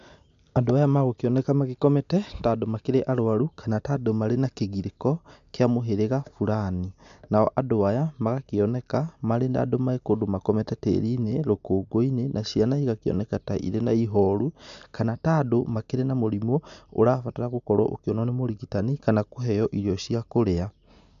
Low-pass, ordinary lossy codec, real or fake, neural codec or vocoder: 7.2 kHz; MP3, 64 kbps; real; none